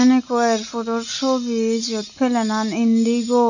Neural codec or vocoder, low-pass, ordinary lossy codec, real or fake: none; 7.2 kHz; none; real